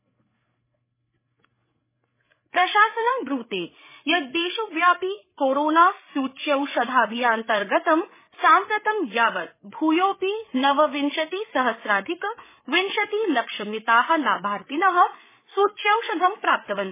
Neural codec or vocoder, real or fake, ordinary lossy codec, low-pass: codec, 16 kHz, 8 kbps, FreqCodec, larger model; fake; MP3, 16 kbps; 3.6 kHz